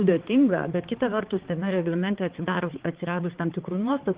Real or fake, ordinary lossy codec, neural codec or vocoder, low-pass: fake; Opus, 16 kbps; codec, 16 kHz, 4 kbps, X-Codec, HuBERT features, trained on balanced general audio; 3.6 kHz